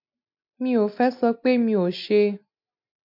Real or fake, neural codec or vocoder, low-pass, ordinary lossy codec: real; none; 5.4 kHz; MP3, 48 kbps